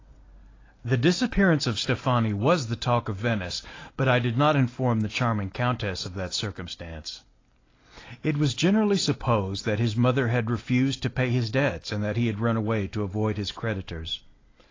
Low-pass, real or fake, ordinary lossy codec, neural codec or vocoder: 7.2 kHz; real; AAC, 32 kbps; none